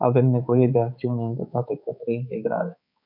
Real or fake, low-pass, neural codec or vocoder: fake; 5.4 kHz; codec, 16 kHz, 4 kbps, X-Codec, HuBERT features, trained on balanced general audio